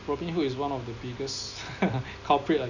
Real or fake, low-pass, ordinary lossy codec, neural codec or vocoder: real; 7.2 kHz; none; none